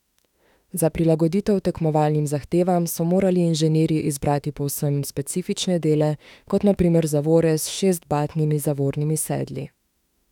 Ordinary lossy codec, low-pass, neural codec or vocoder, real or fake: none; 19.8 kHz; autoencoder, 48 kHz, 32 numbers a frame, DAC-VAE, trained on Japanese speech; fake